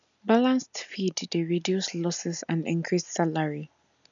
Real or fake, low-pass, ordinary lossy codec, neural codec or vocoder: real; 7.2 kHz; none; none